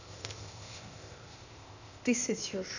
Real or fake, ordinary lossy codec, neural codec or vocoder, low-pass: fake; none; codec, 16 kHz, 0.8 kbps, ZipCodec; 7.2 kHz